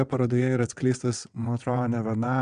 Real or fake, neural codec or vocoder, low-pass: fake; vocoder, 22.05 kHz, 80 mel bands, WaveNeXt; 9.9 kHz